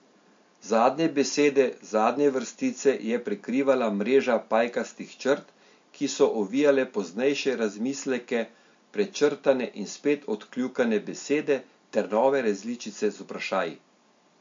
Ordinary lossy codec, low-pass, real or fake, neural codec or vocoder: MP3, 48 kbps; 7.2 kHz; real; none